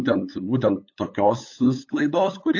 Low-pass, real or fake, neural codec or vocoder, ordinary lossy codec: 7.2 kHz; fake; codec, 16 kHz, 8 kbps, FunCodec, trained on LibriTTS, 25 frames a second; AAC, 48 kbps